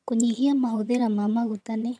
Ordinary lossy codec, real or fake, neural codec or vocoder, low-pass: none; fake; vocoder, 22.05 kHz, 80 mel bands, HiFi-GAN; none